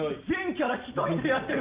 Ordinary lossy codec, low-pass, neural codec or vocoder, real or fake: Opus, 24 kbps; 3.6 kHz; none; real